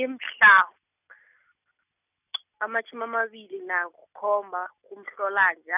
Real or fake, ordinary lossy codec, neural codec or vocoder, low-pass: real; none; none; 3.6 kHz